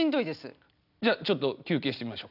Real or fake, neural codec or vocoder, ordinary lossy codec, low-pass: real; none; none; 5.4 kHz